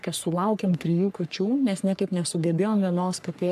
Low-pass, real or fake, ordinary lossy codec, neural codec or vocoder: 14.4 kHz; fake; AAC, 96 kbps; codec, 44.1 kHz, 3.4 kbps, Pupu-Codec